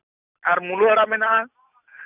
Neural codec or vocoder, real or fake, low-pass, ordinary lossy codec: none; real; 3.6 kHz; none